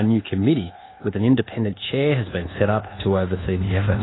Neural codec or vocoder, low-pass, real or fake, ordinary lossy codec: codec, 24 kHz, 1.2 kbps, DualCodec; 7.2 kHz; fake; AAC, 16 kbps